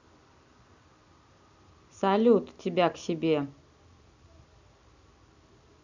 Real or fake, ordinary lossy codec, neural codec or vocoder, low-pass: real; none; none; 7.2 kHz